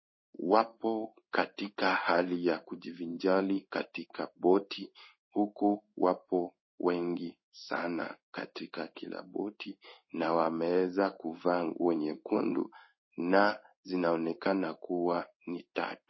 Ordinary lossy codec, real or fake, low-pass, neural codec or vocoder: MP3, 24 kbps; fake; 7.2 kHz; codec, 16 kHz in and 24 kHz out, 1 kbps, XY-Tokenizer